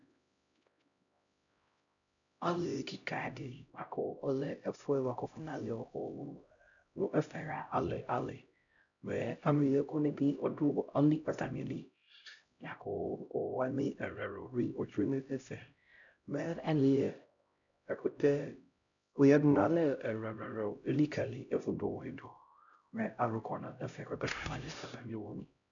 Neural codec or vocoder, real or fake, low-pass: codec, 16 kHz, 0.5 kbps, X-Codec, HuBERT features, trained on LibriSpeech; fake; 7.2 kHz